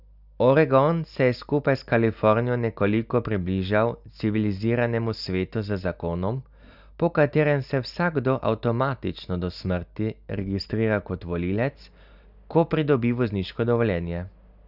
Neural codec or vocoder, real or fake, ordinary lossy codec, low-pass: none; real; none; 5.4 kHz